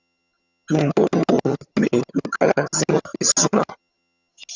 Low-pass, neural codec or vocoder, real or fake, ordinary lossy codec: 7.2 kHz; vocoder, 22.05 kHz, 80 mel bands, HiFi-GAN; fake; Opus, 64 kbps